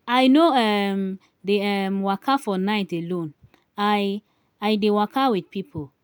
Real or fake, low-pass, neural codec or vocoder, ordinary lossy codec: real; none; none; none